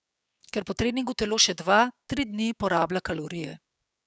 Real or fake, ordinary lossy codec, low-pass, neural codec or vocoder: fake; none; none; codec, 16 kHz, 6 kbps, DAC